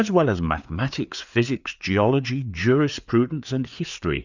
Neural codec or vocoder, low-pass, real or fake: codec, 16 kHz, 4 kbps, FreqCodec, larger model; 7.2 kHz; fake